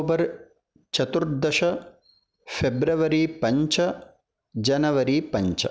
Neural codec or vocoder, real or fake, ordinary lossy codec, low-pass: none; real; none; none